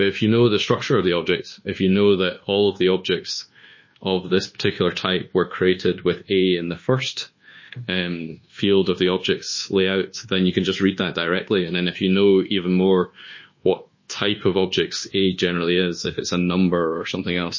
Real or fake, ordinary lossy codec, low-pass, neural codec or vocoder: fake; MP3, 32 kbps; 7.2 kHz; codec, 24 kHz, 1.2 kbps, DualCodec